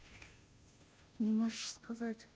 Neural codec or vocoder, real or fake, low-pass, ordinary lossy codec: codec, 16 kHz, 0.5 kbps, FunCodec, trained on Chinese and English, 25 frames a second; fake; none; none